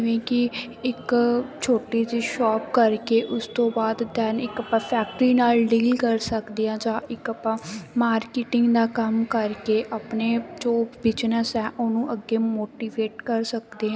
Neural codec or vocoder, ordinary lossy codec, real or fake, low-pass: none; none; real; none